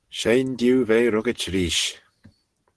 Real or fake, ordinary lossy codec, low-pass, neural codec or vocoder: real; Opus, 16 kbps; 10.8 kHz; none